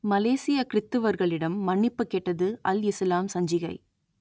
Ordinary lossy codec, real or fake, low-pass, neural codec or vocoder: none; real; none; none